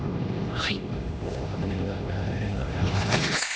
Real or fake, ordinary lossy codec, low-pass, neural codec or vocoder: fake; none; none; codec, 16 kHz, 1 kbps, X-Codec, HuBERT features, trained on LibriSpeech